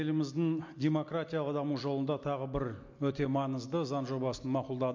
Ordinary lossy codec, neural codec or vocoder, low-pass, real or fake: MP3, 48 kbps; none; 7.2 kHz; real